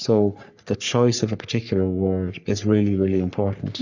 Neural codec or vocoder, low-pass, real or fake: codec, 44.1 kHz, 3.4 kbps, Pupu-Codec; 7.2 kHz; fake